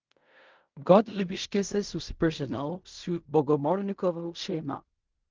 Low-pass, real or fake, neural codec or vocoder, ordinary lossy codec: 7.2 kHz; fake; codec, 16 kHz in and 24 kHz out, 0.4 kbps, LongCat-Audio-Codec, fine tuned four codebook decoder; Opus, 24 kbps